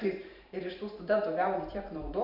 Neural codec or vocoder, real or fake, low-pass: vocoder, 44.1 kHz, 128 mel bands every 512 samples, BigVGAN v2; fake; 5.4 kHz